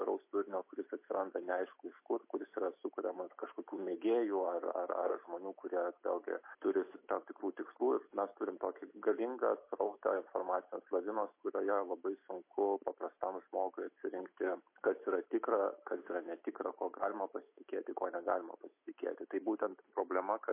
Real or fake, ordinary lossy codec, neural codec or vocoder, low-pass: real; MP3, 24 kbps; none; 3.6 kHz